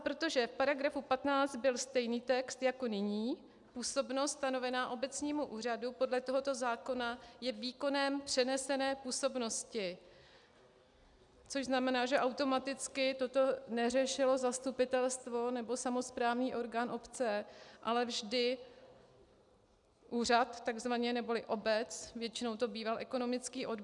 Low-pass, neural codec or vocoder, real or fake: 10.8 kHz; none; real